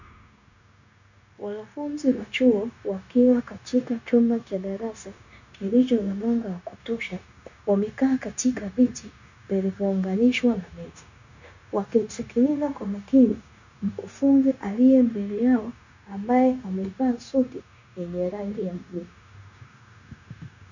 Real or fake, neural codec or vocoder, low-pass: fake; codec, 16 kHz, 0.9 kbps, LongCat-Audio-Codec; 7.2 kHz